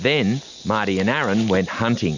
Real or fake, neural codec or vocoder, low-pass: real; none; 7.2 kHz